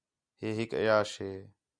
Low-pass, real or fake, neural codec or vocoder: 9.9 kHz; real; none